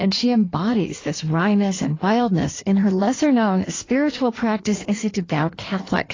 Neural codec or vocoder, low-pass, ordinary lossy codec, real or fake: codec, 16 kHz in and 24 kHz out, 1.1 kbps, FireRedTTS-2 codec; 7.2 kHz; AAC, 32 kbps; fake